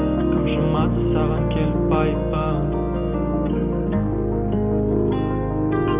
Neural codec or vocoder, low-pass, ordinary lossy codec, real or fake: none; 3.6 kHz; none; real